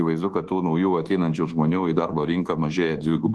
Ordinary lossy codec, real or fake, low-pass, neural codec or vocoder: Opus, 16 kbps; fake; 10.8 kHz; codec, 24 kHz, 1.2 kbps, DualCodec